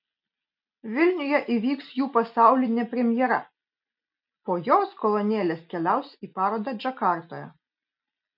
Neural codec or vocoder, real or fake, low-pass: none; real; 5.4 kHz